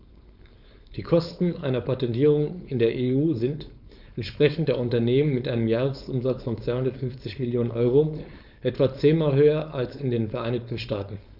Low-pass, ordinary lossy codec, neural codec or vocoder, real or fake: 5.4 kHz; none; codec, 16 kHz, 4.8 kbps, FACodec; fake